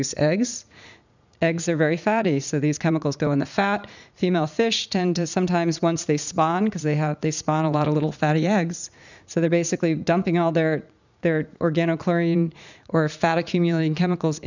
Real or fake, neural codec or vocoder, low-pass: fake; vocoder, 44.1 kHz, 80 mel bands, Vocos; 7.2 kHz